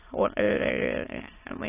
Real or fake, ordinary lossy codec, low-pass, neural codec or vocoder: fake; AAC, 16 kbps; 3.6 kHz; autoencoder, 22.05 kHz, a latent of 192 numbers a frame, VITS, trained on many speakers